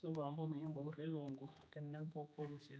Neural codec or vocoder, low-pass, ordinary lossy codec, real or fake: codec, 16 kHz, 2 kbps, X-Codec, HuBERT features, trained on general audio; none; none; fake